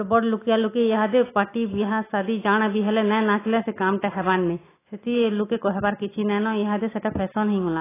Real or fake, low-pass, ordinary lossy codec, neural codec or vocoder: real; 3.6 kHz; AAC, 16 kbps; none